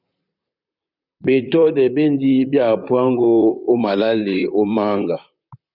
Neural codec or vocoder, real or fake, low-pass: vocoder, 44.1 kHz, 128 mel bands, Pupu-Vocoder; fake; 5.4 kHz